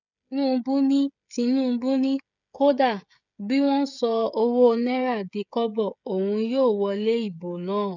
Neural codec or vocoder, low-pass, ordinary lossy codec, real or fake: codec, 16 kHz, 16 kbps, FreqCodec, smaller model; 7.2 kHz; none; fake